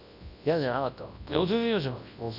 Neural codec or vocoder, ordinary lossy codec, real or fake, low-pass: codec, 24 kHz, 0.9 kbps, WavTokenizer, large speech release; none; fake; 5.4 kHz